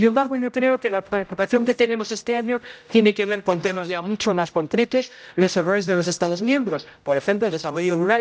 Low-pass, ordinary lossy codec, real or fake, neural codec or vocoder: none; none; fake; codec, 16 kHz, 0.5 kbps, X-Codec, HuBERT features, trained on general audio